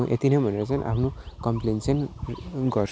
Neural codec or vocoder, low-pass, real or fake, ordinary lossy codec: none; none; real; none